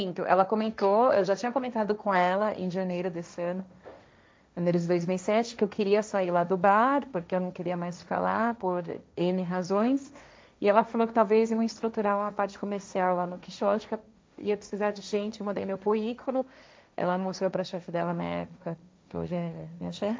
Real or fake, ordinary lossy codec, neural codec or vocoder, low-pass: fake; none; codec, 16 kHz, 1.1 kbps, Voila-Tokenizer; none